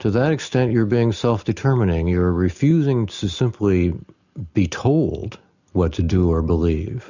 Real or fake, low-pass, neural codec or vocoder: real; 7.2 kHz; none